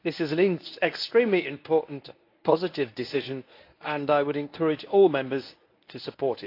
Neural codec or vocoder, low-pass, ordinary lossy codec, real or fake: codec, 24 kHz, 0.9 kbps, WavTokenizer, medium speech release version 1; 5.4 kHz; AAC, 32 kbps; fake